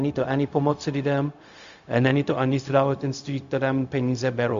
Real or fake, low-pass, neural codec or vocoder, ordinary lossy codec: fake; 7.2 kHz; codec, 16 kHz, 0.4 kbps, LongCat-Audio-Codec; Opus, 64 kbps